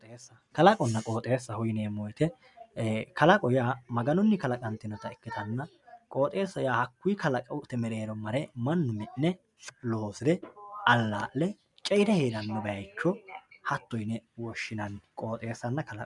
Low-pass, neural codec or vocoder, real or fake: 10.8 kHz; none; real